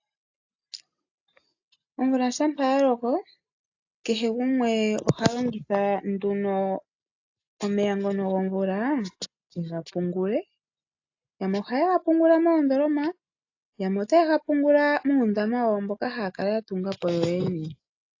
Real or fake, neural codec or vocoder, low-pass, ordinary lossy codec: real; none; 7.2 kHz; AAC, 48 kbps